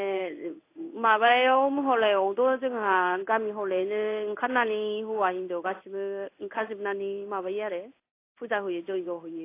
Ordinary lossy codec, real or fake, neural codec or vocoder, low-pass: AAC, 24 kbps; fake; codec, 16 kHz in and 24 kHz out, 1 kbps, XY-Tokenizer; 3.6 kHz